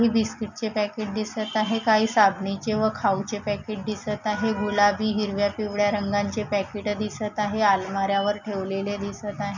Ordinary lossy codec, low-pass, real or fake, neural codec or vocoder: none; 7.2 kHz; real; none